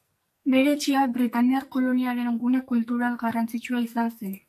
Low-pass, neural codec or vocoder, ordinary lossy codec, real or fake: 14.4 kHz; codec, 44.1 kHz, 2.6 kbps, SNAC; AAC, 96 kbps; fake